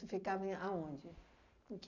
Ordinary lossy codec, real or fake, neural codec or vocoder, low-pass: none; real; none; 7.2 kHz